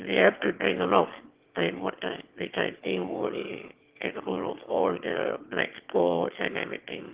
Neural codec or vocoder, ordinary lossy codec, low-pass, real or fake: autoencoder, 22.05 kHz, a latent of 192 numbers a frame, VITS, trained on one speaker; Opus, 16 kbps; 3.6 kHz; fake